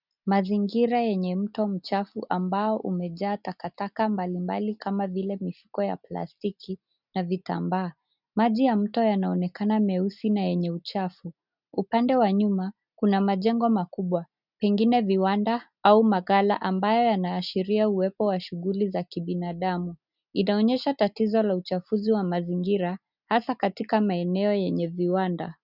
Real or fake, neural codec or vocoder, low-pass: real; none; 5.4 kHz